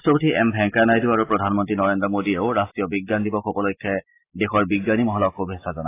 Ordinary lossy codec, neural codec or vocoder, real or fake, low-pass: AAC, 24 kbps; none; real; 3.6 kHz